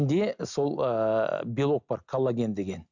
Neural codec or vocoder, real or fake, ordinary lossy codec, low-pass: none; real; none; 7.2 kHz